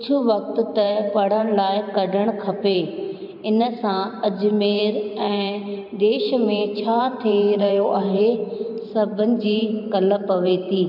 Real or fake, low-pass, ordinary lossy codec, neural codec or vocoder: fake; 5.4 kHz; none; vocoder, 44.1 kHz, 128 mel bands every 512 samples, BigVGAN v2